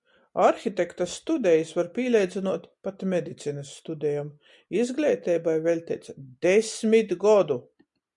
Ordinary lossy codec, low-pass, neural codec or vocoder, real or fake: MP3, 96 kbps; 10.8 kHz; none; real